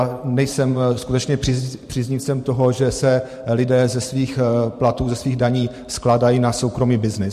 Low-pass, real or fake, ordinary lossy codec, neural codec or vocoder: 14.4 kHz; fake; MP3, 64 kbps; vocoder, 44.1 kHz, 128 mel bands every 512 samples, BigVGAN v2